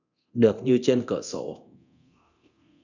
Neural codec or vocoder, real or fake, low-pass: codec, 24 kHz, 0.9 kbps, DualCodec; fake; 7.2 kHz